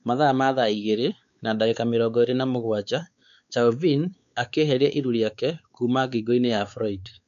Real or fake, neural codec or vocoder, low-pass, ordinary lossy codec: fake; codec, 16 kHz, 4 kbps, X-Codec, WavLM features, trained on Multilingual LibriSpeech; 7.2 kHz; none